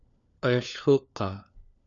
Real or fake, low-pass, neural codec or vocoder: fake; 7.2 kHz; codec, 16 kHz, 4 kbps, FunCodec, trained on LibriTTS, 50 frames a second